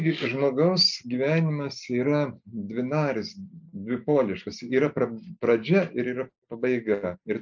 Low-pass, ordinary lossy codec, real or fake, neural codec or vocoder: 7.2 kHz; MP3, 64 kbps; real; none